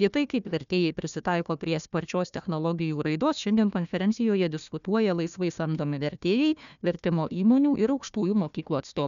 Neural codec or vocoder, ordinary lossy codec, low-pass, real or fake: codec, 16 kHz, 1 kbps, FunCodec, trained on Chinese and English, 50 frames a second; MP3, 96 kbps; 7.2 kHz; fake